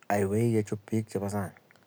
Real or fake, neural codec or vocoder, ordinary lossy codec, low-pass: real; none; none; none